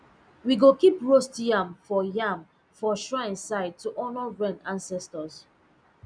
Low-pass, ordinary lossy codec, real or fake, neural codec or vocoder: 9.9 kHz; none; real; none